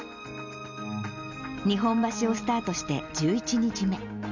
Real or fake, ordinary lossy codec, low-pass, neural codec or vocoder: real; none; 7.2 kHz; none